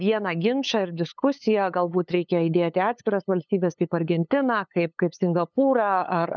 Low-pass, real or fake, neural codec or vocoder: 7.2 kHz; fake; codec, 16 kHz, 8 kbps, FunCodec, trained on LibriTTS, 25 frames a second